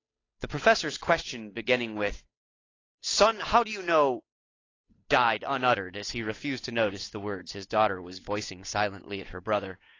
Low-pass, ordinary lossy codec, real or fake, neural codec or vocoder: 7.2 kHz; AAC, 32 kbps; fake; codec, 16 kHz, 8 kbps, FunCodec, trained on Chinese and English, 25 frames a second